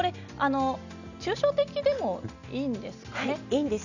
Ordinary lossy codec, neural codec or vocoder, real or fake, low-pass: none; none; real; 7.2 kHz